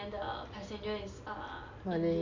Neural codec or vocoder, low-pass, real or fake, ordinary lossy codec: none; 7.2 kHz; real; AAC, 48 kbps